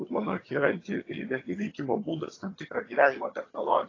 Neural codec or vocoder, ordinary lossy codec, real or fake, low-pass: vocoder, 22.05 kHz, 80 mel bands, HiFi-GAN; AAC, 32 kbps; fake; 7.2 kHz